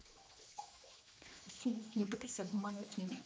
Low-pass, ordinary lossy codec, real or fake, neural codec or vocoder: none; none; fake; codec, 16 kHz, 2 kbps, X-Codec, HuBERT features, trained on general audio